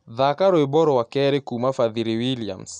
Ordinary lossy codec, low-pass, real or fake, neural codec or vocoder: none; 9.9 kHz; real; none